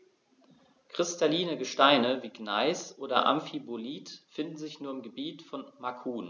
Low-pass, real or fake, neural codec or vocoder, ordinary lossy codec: 7.2 kHz; real; none; none